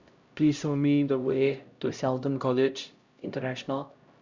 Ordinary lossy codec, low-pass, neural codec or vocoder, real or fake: Opus, 64 kbps; 7.2 kHz; codec, 16 kHz, 0.5 kbps, X-Codec, HuBERT features, trained on LibriSpeech; fake